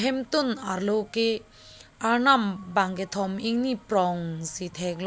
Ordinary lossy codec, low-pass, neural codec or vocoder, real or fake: none; none; none; real